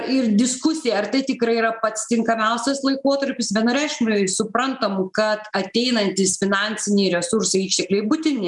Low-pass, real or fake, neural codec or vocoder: 10.8 kHz; real; none